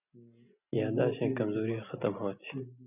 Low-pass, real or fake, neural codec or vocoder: 3.6 kHz; real; none